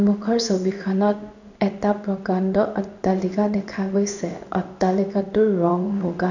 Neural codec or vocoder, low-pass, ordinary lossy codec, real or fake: codec, 16 kHz in and 24 kHz out, 1 kbps, XY-Tokenizer; 7.2 kHz; none; fake